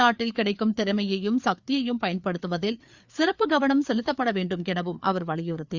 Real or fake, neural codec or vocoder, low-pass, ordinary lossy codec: fake; codec, 16 kHz, 4 kbps, FreqCodec, larger model; 7.2 kHz; Opus, 64 kbps